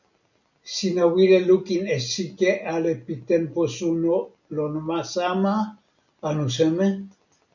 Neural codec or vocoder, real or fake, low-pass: none; real; 7.2 kHz